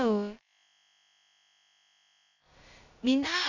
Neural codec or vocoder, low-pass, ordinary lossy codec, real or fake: codec, 16 kHz, about 1 kbps, DyCAST, with the encoder's durations; 7.2 kHz; none; fake